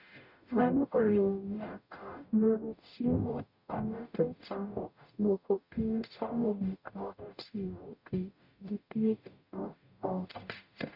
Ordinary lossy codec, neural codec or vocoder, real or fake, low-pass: none; codec, 44.1 kHz, 0.9 kbps, DAC; fake; 5.4 kHz